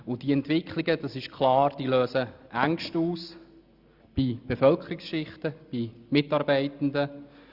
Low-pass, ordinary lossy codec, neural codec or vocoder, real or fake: 5.4 kHz; Opus, 64 kbps; none; real